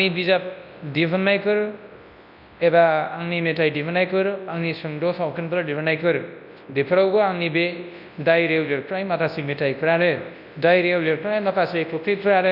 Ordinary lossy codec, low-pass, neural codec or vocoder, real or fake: none; 5.4 kHz; codec, 24 kHz, 0.9 kbps, WavTokenizer, large speech release; fake